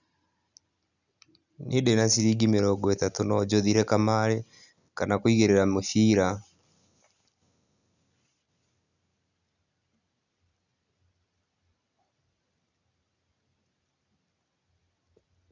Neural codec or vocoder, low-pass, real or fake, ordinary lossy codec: none; 7.2 kHz; real; none